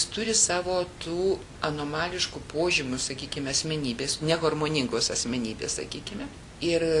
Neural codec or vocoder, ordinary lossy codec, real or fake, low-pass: none; Opus, 64 kbps; real; 10.8 kHz